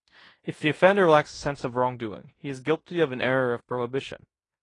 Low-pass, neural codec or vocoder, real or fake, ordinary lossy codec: 10.8 kHz; codec, 16 kHz in and 24 kHz out, 0.9 kbps, LongCat-Audio-Codec, fine tuned four codebook decoder; fake; AAC, 32 kbps